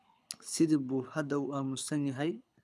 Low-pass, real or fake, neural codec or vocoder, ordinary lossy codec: none; fake; codec, 24 kHz, 6 kbps, HILCodec; none